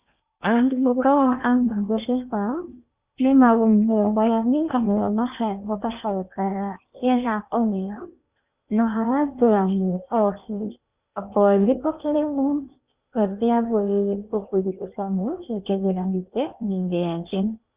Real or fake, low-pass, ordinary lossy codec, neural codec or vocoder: fake; 3.6 kHz; Opus, 64 kbps; codec, 16 kHz in and 24 kHz out, 0.8 kbps, FocalCodec, streaming, 65536 codes